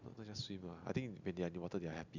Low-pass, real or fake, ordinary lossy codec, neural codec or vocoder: 7.2 kHz; real; none; none